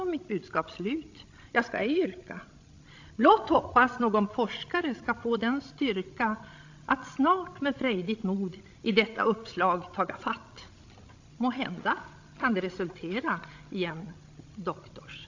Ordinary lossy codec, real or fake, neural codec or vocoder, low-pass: AAC, 48 kbps; fake; codec, 16 kHz, 16 kbps, FreqCodec, larger model; 7.2 kHz